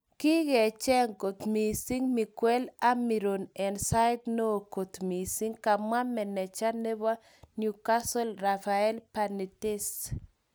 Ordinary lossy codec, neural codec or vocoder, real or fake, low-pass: none; none; real; none